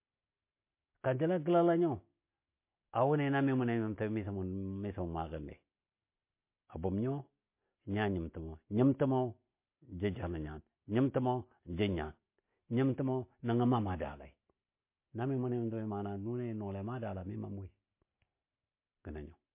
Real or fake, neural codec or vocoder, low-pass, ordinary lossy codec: real; none; 3.6 kHz; MP3, 24 kbps